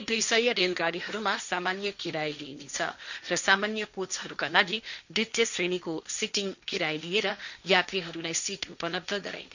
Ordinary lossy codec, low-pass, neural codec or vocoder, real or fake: none; 7.2 kHz; codec, 16 kHz, 1.1 kbps, Voila-Tokenizer; fake